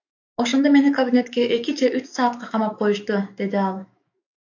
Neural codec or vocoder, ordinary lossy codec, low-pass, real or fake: autoencoder, 48 kHz, 128 numbers a frame, DAC-VAE, trained on Japanese speech; AAC, 48 kbps; 7.2 kHz; fake